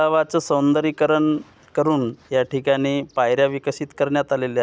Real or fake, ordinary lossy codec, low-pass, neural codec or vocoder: real; none; none; none